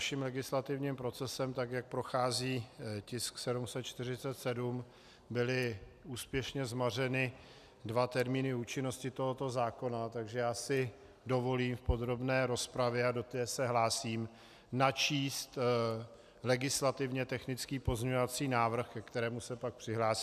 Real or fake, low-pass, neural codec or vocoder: real; 14.4 kHz; none